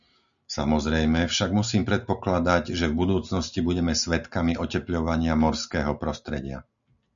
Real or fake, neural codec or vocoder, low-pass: real; none; 7.2 kHz